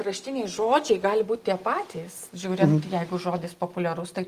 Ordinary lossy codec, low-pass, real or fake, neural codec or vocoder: Opus, 24 kbps; 14.4 kHz; real; none